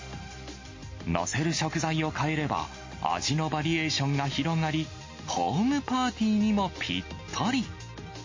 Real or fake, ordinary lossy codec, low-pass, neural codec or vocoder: real; MP3, 32 kbps; 7.2 kHz; none